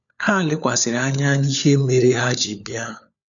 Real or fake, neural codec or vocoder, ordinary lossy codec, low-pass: fake; codec, 16 kHz, 4 kbps, FunCodec, trained on LibriTTS, 50 frames a second; none; 7.2 kHz